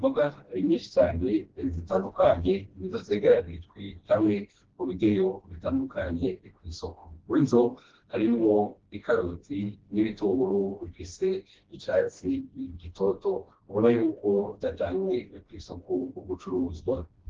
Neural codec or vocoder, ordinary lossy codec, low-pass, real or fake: codec, 16 kHz, 1 kbps, FreqCodec, smaller model; Opus, 16 kbps; 7.2 kHz; fake